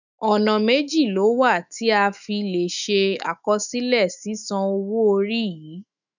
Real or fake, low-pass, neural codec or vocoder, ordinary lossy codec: fake; 7.2 kHz; autoencoder, 48 kHz, 128 numbers a frame, DAC-VAE, trained on Japanese speech; none